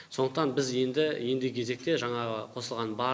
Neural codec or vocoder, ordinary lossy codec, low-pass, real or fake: none; none; none; real